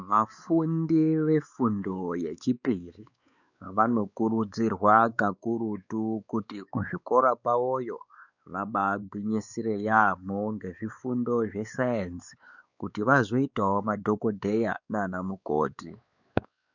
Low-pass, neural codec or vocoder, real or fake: 7.2 kHz; codec, 16 kHz, 4 kbps, X-Codec, WavLM features, trained on Multilingual LibriSpeech; fake